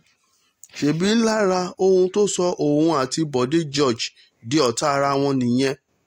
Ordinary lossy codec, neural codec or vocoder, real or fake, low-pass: AAC, 48 kbps; none; real; 19.8 kHz